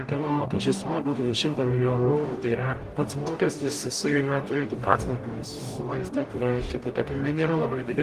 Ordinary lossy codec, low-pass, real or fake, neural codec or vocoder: Opus, 24 kbps; 14.4 kHz; fake; codec, 44.1 kHz, 0.9 kbps, DAC